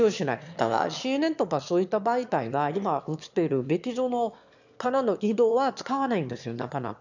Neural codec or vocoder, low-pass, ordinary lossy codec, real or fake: autoencoder, 22.05 kHz, a latent of 192 numbers a frame, VITS, trained on one speaker; 7.2 kHz; none; fake